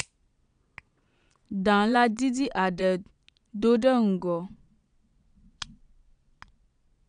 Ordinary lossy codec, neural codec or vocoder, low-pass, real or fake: none; vocoder, 22.05 kHz, 80 mel bands, Vocos; 9.9 kHz; fake